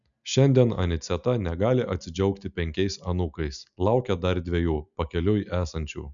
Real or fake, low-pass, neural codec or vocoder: real; 7.2 kHz; none